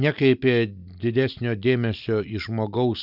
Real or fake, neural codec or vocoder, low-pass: real; none; 5.4 kHz